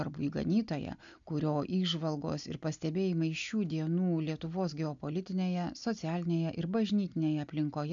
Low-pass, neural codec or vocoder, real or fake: 7.2 kHz; none; real